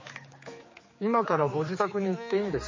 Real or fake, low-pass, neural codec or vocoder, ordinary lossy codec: fake; 7.2 kHz; codec, 16 kHz, 4 kbps, X-Codec, HuBERT features, trained on general audio; MP3, 32 kbps